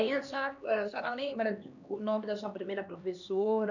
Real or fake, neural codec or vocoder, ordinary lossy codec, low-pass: fake; codec, 16 kHz, 2 kbps, X-Codec, HuBERT features, trained on LibriSpeech; none; 7.2 kHz